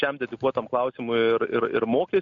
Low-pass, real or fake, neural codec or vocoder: 7.2 kHz; real; none